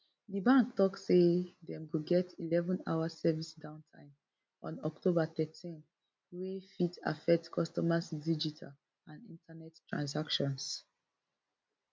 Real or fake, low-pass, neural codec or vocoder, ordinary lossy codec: real; none; none; none